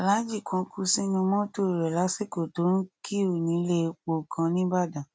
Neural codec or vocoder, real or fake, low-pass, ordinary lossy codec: none; real; none; none